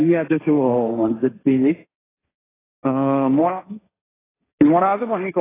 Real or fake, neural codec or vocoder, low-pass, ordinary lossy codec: fake; codec, 16 kHz, 1.1 kbps, Voila-Tokenizer; 3.6 kHz; AAC, 16 kbps